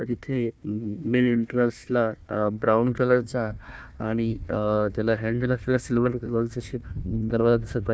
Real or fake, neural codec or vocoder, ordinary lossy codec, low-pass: fake; codec, 16 kHz, 1 kbps, FunCodec, trained on Chinese and English, 50 frames a second; none; none